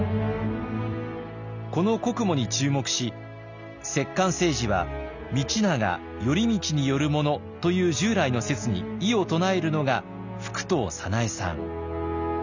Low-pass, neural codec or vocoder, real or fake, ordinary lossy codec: 7.2 kHz; none; real; none